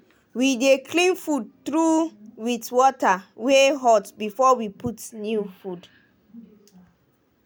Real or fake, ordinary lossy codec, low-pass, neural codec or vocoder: real; none; none; none